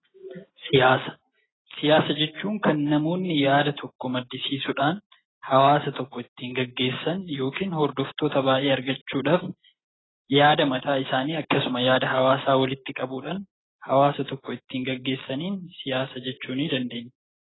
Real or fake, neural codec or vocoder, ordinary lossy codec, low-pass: real; none; AAC, 16 kbps; 7.2 kHz